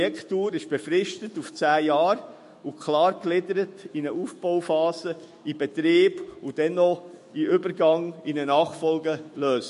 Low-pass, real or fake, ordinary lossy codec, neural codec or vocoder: 14.4 kHz; fake; MP3, 48 kbps; autoencoder, 48 kHz, 128 numbers a frame, DAC-VAE, trained on Japanese speech